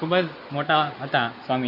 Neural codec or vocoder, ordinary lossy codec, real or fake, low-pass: none; none; real; 5.4 kHz